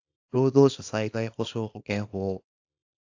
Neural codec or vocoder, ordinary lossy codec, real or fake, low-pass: codec, 24 kHz, 0.9 kbps, WavTokenizer, small release; AAC, 48 kbps; fake; 7.2 kHz